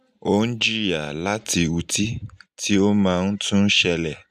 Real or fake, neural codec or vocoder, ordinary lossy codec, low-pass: real; none; none; 14.4 kHz